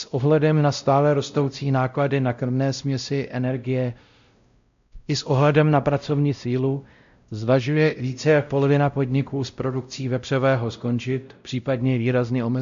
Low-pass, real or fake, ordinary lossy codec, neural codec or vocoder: 7.2 kHz; fake; MP3, 64 kbps; codec, 16 kHz, 0.5 kbps, X-Codec, WavLM features, trained on Multilingual LibriSpeech